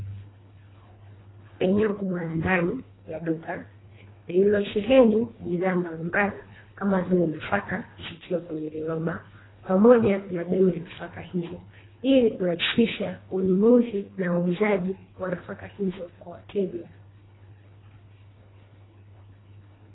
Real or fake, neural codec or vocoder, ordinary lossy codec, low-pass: fake; codec, 24 kHz, 1.5 kbps, HILCodec; AAC, 16 kbps; 7.2 kHz